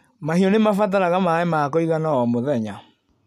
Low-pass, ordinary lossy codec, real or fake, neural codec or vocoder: 14.4 kHz; none; real; none